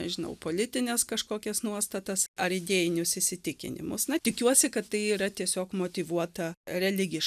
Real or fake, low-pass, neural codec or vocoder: real; 14.4 kHz; none